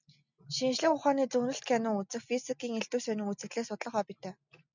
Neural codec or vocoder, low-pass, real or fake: none; 7.2 kHz; real